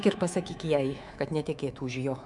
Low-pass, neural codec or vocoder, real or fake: 10.8 kHz; codec, 24 kHz, 3.1 kbps, DualCodec; fake